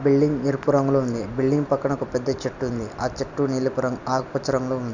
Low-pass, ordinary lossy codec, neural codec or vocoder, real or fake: 7.2 kHz; none; none; real